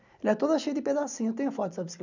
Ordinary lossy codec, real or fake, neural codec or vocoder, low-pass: none; real; none; 7.2 kHz